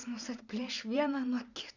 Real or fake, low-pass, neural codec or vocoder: fake; 7.2 kHz; vocoder, 44.1 kHz, 128 mel bands every 512 samples, BigVGAN v2